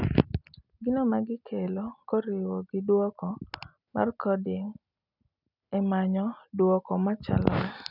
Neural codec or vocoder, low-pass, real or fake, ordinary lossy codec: none; 5.4 kHz; real; none